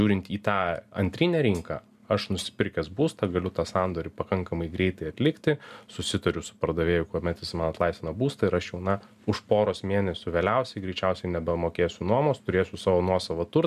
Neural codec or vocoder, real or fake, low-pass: none; real; 14.4 kHz